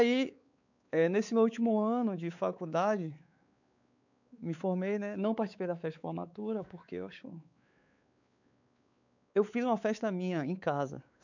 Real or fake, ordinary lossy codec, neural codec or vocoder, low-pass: fake; none; codec, 16 kHz, 4 kbps, X-Codec, WavLM features, trained on Multilingual LibriSpeech; 7.2 kHz